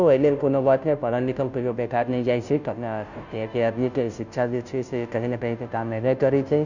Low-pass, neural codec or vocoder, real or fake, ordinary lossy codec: 7.2 kHz; codec, 16 kHz, 0.5 kbps, FunCodec, trained on Chinese and English, 25 frames a second; fake; none